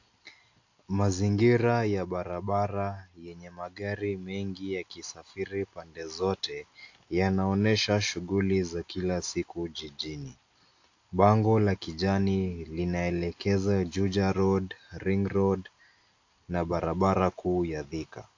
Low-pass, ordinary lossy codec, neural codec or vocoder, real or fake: 7.2 kHz; AAC, 48 kbps; none; real